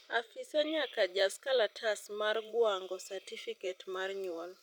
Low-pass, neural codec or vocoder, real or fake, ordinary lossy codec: 19.8 kHz; vocoder, 44.1 kHz, 128 mel bands every 512 samples, BigVGAN v2; fake; none